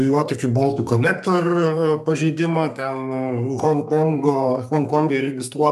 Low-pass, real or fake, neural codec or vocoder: 14.4 kHz; fake; codec, 32 kHz, 1.9 kbps, SNAC